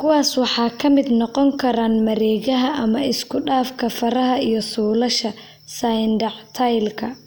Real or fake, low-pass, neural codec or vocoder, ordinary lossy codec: real; none; none; none